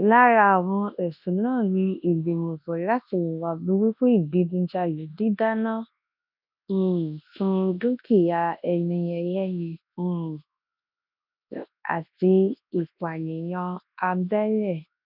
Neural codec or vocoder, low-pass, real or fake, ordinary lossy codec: codec, 24 kHz, 0.9 kbps, WavTokenizer, large speech release; 5.4 kHz; fake; none